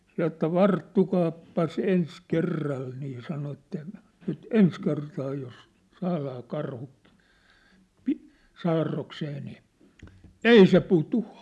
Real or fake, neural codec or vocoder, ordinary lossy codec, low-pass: real; none; none; none